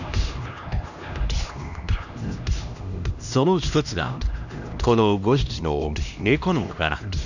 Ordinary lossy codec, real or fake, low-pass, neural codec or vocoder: none; fake; 7.2 kHz; codec, 16 kHz, 1 kbps, X-Codec, HuBERT features, trained on LibriSpeech